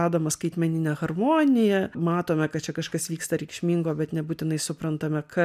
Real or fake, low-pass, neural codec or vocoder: real; 14.4 kHz; none